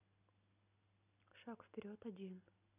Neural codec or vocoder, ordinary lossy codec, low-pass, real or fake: none; none; 3.6 kHz; real